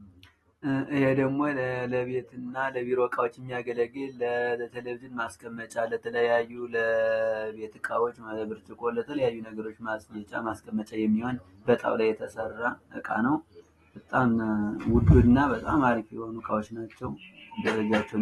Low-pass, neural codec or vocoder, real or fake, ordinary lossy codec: 19.8 kHz; none; real; AAC, 32 kbps